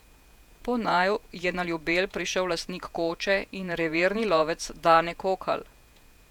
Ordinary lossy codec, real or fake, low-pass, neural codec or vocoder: none; fake; 19.8 kHz; vocoder, 48 kHz, 128 mel bands, Vocos